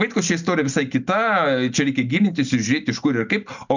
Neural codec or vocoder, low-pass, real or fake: none; 7.2 kHz; real